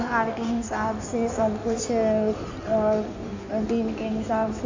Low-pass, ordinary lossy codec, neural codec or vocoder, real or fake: 7.2 kHz; AAC, 48 kbps; codec, 16 kHz in and 24 kHz out, 1.1 kbps, FireRedTTS-2 codec; fake